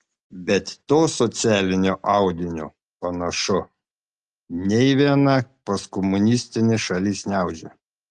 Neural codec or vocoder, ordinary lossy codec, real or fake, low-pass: none; Opus, 32 kbps; real; 10.8 kHz